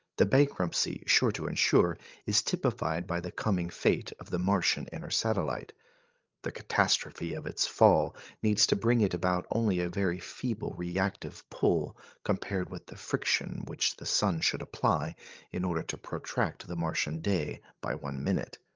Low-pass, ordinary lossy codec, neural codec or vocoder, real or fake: 7.2 kHz; Opus, 32 kbps; codec, 16 kHz, 16 kbps, FreqCodec, larger model; fake